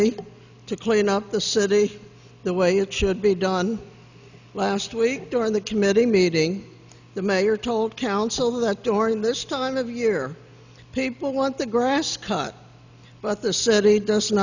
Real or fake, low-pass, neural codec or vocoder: real; 7.2 kHz; none